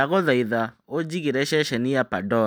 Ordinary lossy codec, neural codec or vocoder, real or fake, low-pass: none; none; real; none